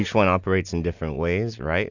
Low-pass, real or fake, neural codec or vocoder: 7.2 kHz; real; none